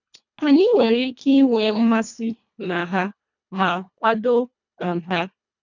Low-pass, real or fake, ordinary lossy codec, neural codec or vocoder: 7.2 kHz; fake; none; codec, 24 kHz, 1.5 kbps, HILCodec